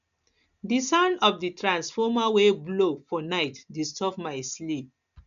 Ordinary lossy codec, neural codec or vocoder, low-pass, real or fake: none; none; 7.2 kHz; real